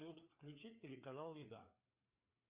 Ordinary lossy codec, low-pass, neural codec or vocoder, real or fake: Opus, 64 kbps; 3.6 kHz; codec, 16 kHz, 4 kbps, FreqCodec, larger model; fake